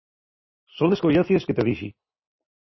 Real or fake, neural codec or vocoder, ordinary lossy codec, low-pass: real; none; MP3, 24 kbps; 7.2 kHz